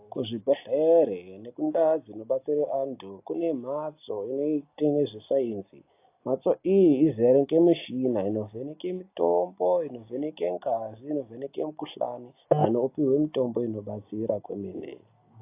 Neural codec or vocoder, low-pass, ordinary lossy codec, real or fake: none; 3.6 kHz; AAC, 24 kbps; real